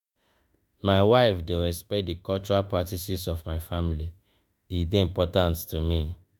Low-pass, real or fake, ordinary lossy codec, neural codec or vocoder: 19.8 kHz; fake; none; autoencoder, 48 kHz, 32 numbers a frame, DAC-VAE, trained on Japanese speech